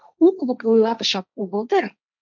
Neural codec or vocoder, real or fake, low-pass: codec, 16 kHz, 1.1 kbps, Voila-Tokenizer; fake; 7.2 kHz